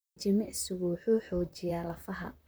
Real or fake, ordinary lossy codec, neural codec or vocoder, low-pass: fake; none; vocoder, 44.1 kHz, 128 mel bands, Pupu-Vocoder; none